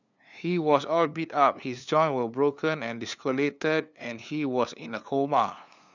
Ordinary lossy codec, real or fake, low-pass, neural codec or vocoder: none; fake; 7.2 kHz; codec, 16 kHz, 2 kbps, FunCodec, trained on LibriTTS, 25 frames a second